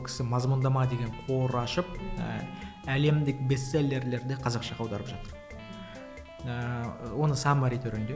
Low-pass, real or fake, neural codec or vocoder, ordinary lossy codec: none; real; none; none